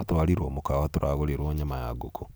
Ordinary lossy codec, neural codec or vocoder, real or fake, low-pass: none; none; real; none